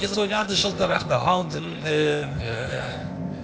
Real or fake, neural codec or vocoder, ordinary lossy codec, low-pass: fake; codec, 16 kHz, 0.8 kbps, ZipCodec; none; none